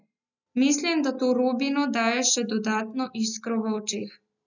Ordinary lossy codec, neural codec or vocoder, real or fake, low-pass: none; none; real; 7.2 kHz